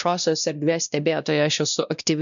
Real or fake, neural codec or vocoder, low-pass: fake; codec, 16 kHz, 1 kbps, X-Codec, WavLM features, trained on Multilingual LibriSpeech; 7.2 kHz